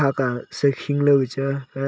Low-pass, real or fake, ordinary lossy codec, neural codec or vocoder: none; real; none; none